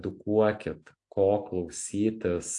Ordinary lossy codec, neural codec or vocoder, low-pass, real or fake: AAC, 64 kbps; none; 10.8 kHz; real